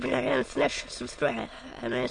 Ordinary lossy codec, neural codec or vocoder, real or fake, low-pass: MP3, 48 kbps; autoencoder, 22.05 kHz, a latent of 192 numbers a frame, VITS, trained on many speakers; fake; 9.9 kHz